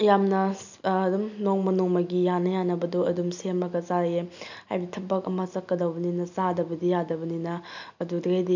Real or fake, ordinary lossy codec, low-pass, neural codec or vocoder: real; none; 7.2 kHz; none